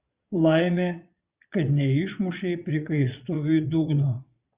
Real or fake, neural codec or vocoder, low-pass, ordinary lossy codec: fake; vocoder, 22.05 kHz, 80 mel bands, Vocos; 3.6 kHz; Opus, 64 kbps